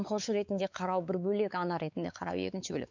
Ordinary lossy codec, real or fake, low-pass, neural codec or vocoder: none; fake; 7.2 kHz; codec, 16 kHz, 4 kbps, X-Codec, WavLM features, trained on Multilingual LibriSpeech